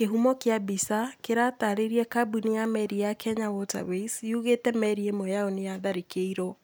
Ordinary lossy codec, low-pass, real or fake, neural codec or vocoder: none; none; real; none